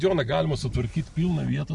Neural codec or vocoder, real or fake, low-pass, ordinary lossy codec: none; real; 10.8 kHz; MP3, 96 kbps